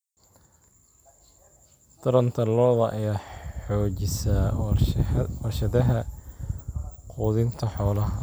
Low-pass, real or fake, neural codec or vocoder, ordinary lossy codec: none; real; none; none